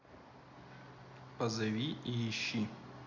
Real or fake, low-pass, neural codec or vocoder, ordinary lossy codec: real; 7.2 kHz; none; none